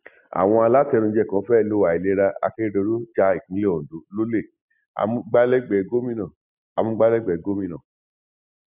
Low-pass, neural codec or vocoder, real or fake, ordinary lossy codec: 3.6 kHz; none; real; none